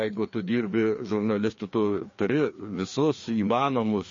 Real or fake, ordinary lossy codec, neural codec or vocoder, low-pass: fake; MP3, 32 kbps; codec, 16 kHz, 2 kbps, FreqCodec, larger model; 7.2 kHz